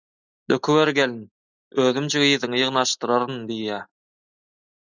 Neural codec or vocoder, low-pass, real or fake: none; 7.2 kHz; real